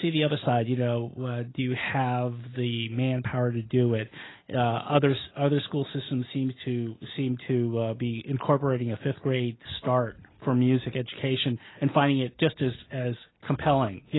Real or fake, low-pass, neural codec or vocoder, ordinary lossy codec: real; 7.2 kHz; none; AAC, 16 kbps